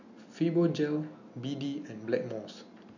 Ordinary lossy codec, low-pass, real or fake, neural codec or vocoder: none; 7.2 kHz; real; none